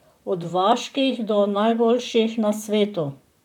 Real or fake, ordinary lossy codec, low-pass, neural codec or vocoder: fake; none; 19.8 kHz; vocoder, 44.1 kHz, 128 mel bands, Pupu-Vocoder